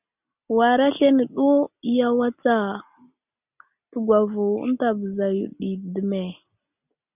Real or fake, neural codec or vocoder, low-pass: real; none; 3.6 kHz